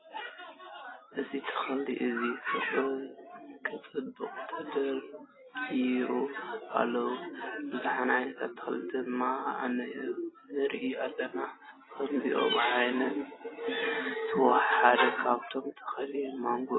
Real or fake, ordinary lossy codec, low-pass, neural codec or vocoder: real; AAC, 16 kbps; 7.2 kHz; none